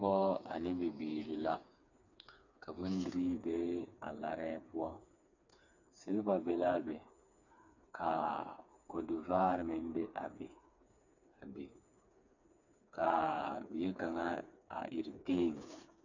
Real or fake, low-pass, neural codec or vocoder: fake; 7.2 kHz; codec, 16 kHz, 4 kbps, FreqCodec, smaller model